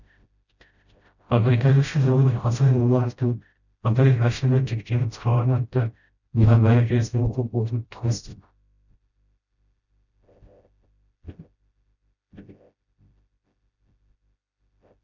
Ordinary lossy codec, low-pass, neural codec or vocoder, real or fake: none; 7.2 kHz; codec, 16 kHz, 0.5 kbps, FreqCodec, smaller model; fake